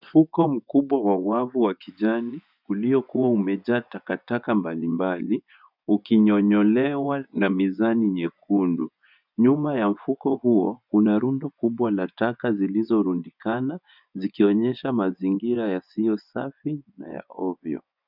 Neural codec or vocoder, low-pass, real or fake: vocoder, 24 kHz, 100 mel bands, Vocos; 5.4 kHz; fake